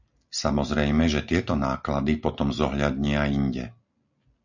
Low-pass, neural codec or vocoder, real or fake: 7.2 kHz; none; real